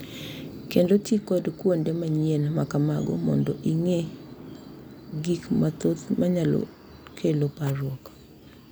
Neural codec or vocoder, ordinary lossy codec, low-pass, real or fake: none; none; none; real